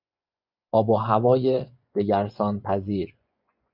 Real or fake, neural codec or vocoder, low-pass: fake; vocoder, 24 kHz, 100 mel bands, Vocos; 5.4 kHz